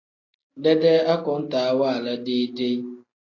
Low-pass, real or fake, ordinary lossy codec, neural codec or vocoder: 7.2 kHz; real; AAC, 32 kbps; none